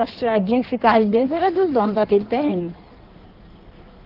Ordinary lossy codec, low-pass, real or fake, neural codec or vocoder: Opus, 16 kbps; 5.4 kHz; fake; codec, 16 kHz in and 24 kHz out, 1.1 kbps, FireRedTTS-2 codec